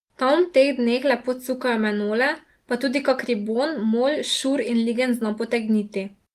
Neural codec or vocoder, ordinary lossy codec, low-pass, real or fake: none; Opus, 24 kbps; 14.4 kHz; real